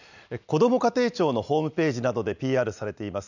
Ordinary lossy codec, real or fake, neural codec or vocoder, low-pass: none; real; none; 7.2 kHz